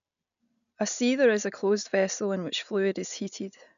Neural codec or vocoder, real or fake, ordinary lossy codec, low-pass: none; real; none; 7.2 kHz